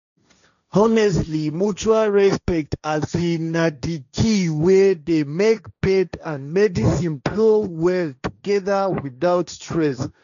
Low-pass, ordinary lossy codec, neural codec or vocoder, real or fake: 7.2 kHz; none; codec, 16 kHz, 1.1 kbps, Voila-Tokenizer; fake